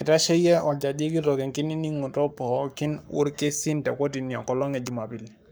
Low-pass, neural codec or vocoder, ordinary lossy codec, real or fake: none; codec, 44.1 kHz, 7.8 kbps, Pupu-Codec; none; fake